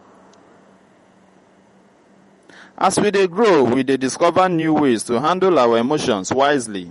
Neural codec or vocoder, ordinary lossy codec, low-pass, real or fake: vocoder, 48 kHz, 128 mel bands, Vocos; MP3, 48 kbps; 19.8 kHz; fake